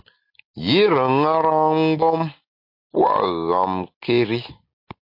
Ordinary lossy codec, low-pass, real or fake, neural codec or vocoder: MP3, 32 kbps; 5.4 kHz; real; none